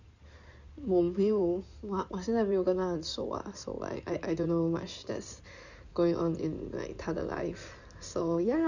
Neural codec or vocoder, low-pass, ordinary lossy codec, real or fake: codec, 16 kHz in and 24 kHz out, 2.2 kbps, FireRedTTS-2 codec; 7.2 kHz; none; fake